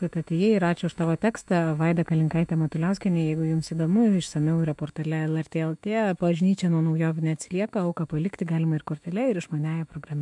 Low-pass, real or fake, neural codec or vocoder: 10.8 kHz; fake; autoencoder, 48 kHz, 128 numbers a frame, DAC-VAE, trained on Japanese speech